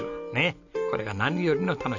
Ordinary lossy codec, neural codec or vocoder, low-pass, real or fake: none; none; 7.2 kHz; real